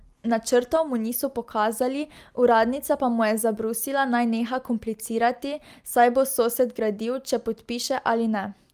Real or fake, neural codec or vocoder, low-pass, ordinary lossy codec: real; none; 14.4 kHz; Opus, 32 kbps